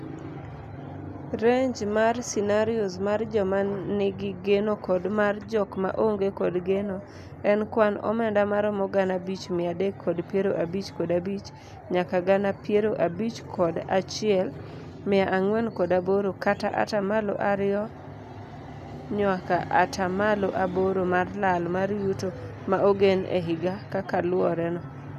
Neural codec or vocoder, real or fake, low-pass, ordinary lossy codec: none; real; 14.4 kHz; none